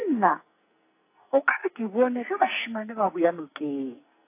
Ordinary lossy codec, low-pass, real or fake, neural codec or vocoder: AAC, 24 kbps; 3.6 kHz; fake; codec, 44.1 kHz, 2.6 kbps, SNAC